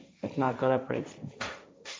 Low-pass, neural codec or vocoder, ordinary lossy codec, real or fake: none; codec, 16 kHz, 1.1 kbps, Voila-Tokenizer; none; fake